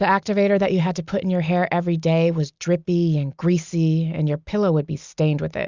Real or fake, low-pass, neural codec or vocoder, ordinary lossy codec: real; 7.2 kHz; none; Opus, 64 kbps